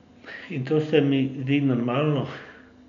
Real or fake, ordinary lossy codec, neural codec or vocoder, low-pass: real; none; none; 7.2 kHz